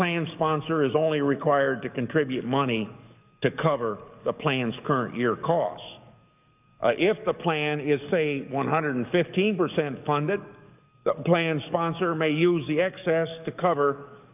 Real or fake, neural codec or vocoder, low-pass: fake; codec, 44.1 kHz, 7.8 kbps, DAC; 3.6 kHz